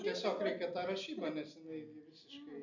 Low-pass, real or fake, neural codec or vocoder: 7.2 kHz; real; none